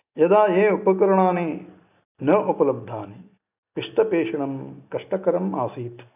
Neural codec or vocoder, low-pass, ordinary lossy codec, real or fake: none; 3.6 kHz; none; real